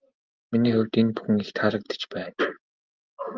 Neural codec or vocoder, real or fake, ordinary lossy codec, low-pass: none; real; Opus, 32 kbps; 7.2 kHz